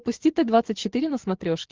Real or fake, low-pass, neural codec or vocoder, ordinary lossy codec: real; 7.2 kHz; none; Opus, 16 kbps